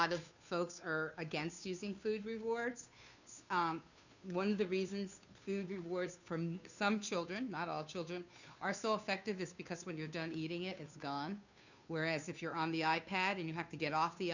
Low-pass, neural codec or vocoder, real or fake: 7.2 kHz; codec, 16 kHz, 2 kbps, FunCodec, trained on Chinese and English, 25 frames a second; fake